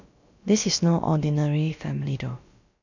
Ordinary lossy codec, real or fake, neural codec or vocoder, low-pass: none; fake; codec, 16 kHz, about 1 kbps, DyCAST, with the encoder's durations; 7.2 kHz